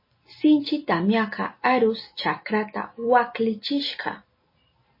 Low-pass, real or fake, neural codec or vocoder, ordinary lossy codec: 5.4 kHz; real; none; MP3, 24 kbps